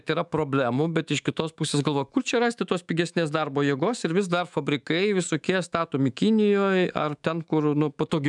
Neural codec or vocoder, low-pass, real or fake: autoencoder, 48 kHz, 128 numbers a frame, DAC-VAE, trained on Japanese speech; 10.8 kHz; fake